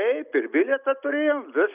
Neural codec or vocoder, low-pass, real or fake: none; 3.6 kHz; real